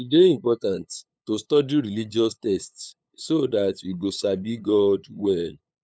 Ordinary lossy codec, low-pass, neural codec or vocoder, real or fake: none; none; codec, 16 kHz, 8 kbps, FunCodec, trained on LibriTTS, 25 frames a second; fake